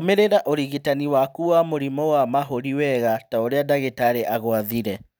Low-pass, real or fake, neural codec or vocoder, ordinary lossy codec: none; real; none; none